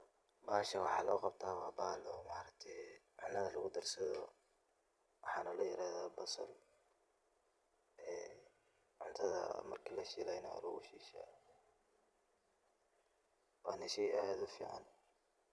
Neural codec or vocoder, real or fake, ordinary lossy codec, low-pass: vocoder, 22.05 kHz, 80 mel bands, Vocos; fake; none; none